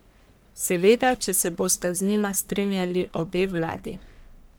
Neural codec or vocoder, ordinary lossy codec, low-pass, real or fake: codec, 44.1 kHz, 1.7 kbps, Pupu-Codec; none; none; fake